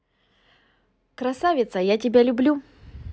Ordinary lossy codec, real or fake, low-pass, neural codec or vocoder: none; real; none; none